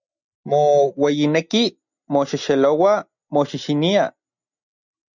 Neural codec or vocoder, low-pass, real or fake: none; 7.2 kHz; real